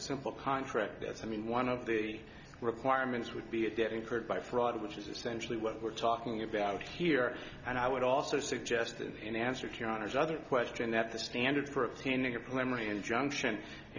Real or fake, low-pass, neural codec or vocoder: real; 7.2 kHz; none